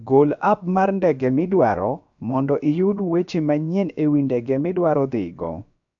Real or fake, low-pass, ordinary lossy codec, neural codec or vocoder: fake; 7.2 kHz; AAC, 64 kbps; codec, 16 kHz, about 1 kbps, DyCAST, with the encoder's durations